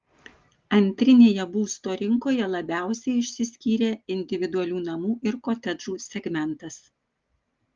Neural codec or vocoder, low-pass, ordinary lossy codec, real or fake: none; 7.2 kHz; Opus, 32 kbps; real